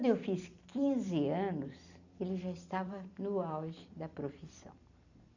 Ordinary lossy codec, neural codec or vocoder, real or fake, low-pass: none; vocoder, 44.1 kHz, 128 mel bands every 256 samples, BigVGAN v2; fake; 7.2 kHz